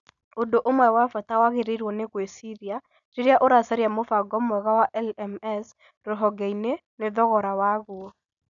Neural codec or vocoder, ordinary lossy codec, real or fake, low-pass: none; none; real; 7.2 kHz